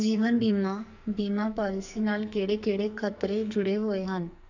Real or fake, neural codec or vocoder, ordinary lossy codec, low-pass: fake; codec, 44.1 kHz, 2.6 kbps, SNAC; none; 7.2 kHz